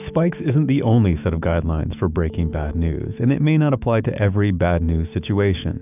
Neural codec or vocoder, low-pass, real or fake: autoencoder, 48 kHz, 128 numbers a frame, DAC-VAE, trained on Japanese speech; 3.6 kHz; fake